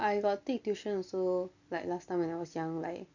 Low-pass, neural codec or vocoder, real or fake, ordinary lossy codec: 7.2 kHz; none; real; none